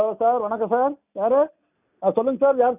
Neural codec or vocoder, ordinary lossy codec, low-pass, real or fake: none; none; 3.6 kHz; real